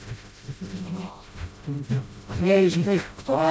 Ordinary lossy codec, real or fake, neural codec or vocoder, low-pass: none; fake; codec, 16 kHz, 0.5 kbps, FreqCodec, smaller model; none